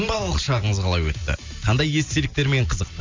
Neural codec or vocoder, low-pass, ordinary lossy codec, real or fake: none; 7.2 kHz; MP3, 64 kbps; real